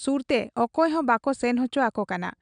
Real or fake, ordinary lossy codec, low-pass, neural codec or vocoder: real; none; 9.9 kHz; none